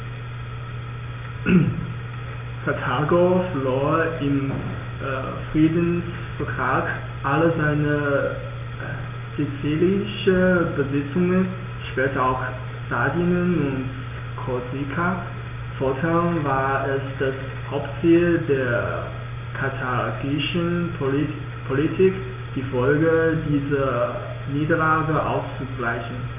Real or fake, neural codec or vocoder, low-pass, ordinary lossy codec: real; none; 3.6 kHz; none